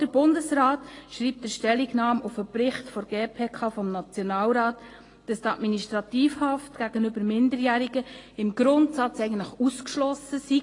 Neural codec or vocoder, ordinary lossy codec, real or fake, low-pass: none; AAC, 32 kbps; real; 10.8 kHz